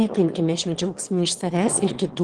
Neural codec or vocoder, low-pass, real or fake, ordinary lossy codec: autoencoder, 22.05 kHz, a latent of 192 numbers a frame, VITS, trained on one speaker; 9.9 kHz; fake; Opus, 16 kbps